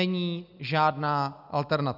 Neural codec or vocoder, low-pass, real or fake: none; 5.4 kHz; real